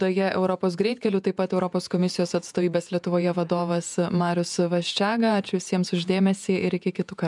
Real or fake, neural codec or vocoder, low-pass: fake; vocoder, 48 kHz, 128 mel bands, Vocos; 10.8 kHz